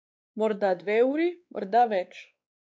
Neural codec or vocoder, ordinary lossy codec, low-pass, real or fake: codec, 16 kHz, 2 kbps, X-Codec, WavLM features, trained on Multilingual LibriSpeech; none; none; fake